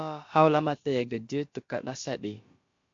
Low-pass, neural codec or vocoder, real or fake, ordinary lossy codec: 7.2 kHz; codec, 16 kHz, about 1 kbps, DyCAST, with the encoder's durations; fake; MP3, 48 kbps